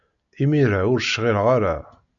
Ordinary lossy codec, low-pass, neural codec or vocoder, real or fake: MP3, 96 kbps; 7.2 kHz; none; real